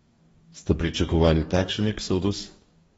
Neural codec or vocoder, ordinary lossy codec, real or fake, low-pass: codec, 44.1 kHz, 2.6 kbps, DAC; AAC, 24 kbps; fake; 19.8 kHz